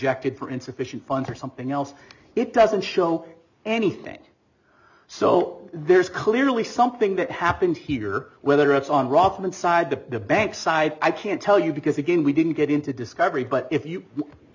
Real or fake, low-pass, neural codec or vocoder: real; 7.2 kHz; none